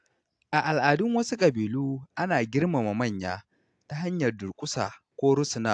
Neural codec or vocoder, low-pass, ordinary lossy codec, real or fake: none; 9.9 kHz; AAC, 64 kbps; real